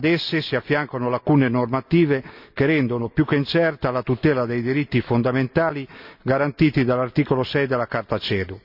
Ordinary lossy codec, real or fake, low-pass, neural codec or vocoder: none; real; 5.4 kHz; none